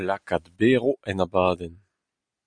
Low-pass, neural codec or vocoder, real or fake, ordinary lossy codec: 9.9 kHz; none; real; Opus, 64 kbps